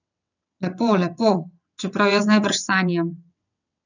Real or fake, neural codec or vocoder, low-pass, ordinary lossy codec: fake; vocoder, 22.05 kHz, 80 mel bands, WaveNeXt; 7.2 kHz; none